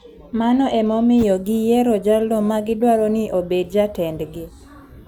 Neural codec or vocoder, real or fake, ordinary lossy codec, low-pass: none; real; none; 19.8 kHz